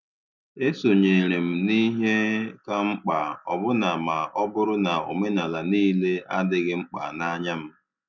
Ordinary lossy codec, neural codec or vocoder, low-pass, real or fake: none; none; 7.2 kHz; real